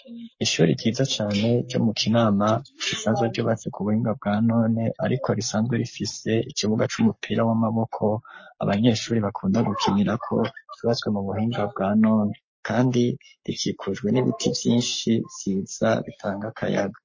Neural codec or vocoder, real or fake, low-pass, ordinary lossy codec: codec, 44.1 kHz, 7.8 kbps, Pupu-Codec; fake; 7.2 kHz; MP3, 32 kbps